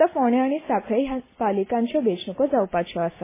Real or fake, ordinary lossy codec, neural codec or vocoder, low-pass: real; MP3, 16 kbps; none; 3.6 kHz